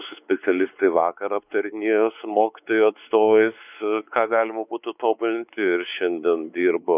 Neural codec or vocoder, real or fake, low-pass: codec, 16 kHz, 4 kbps, X-Codec, WavLM features, trained on Multilingual LibriSpeech; fake; 3.6 kHz